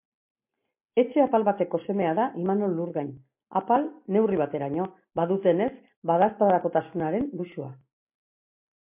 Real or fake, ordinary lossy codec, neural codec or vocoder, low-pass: real; MP3, 32 kbps; none; 3.6 kHz